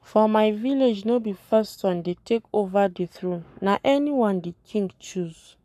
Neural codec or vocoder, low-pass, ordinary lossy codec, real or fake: codec, 44.1 kHz, 7.8 kbps, Pupu-Codec; 14.4 kHz; none; fake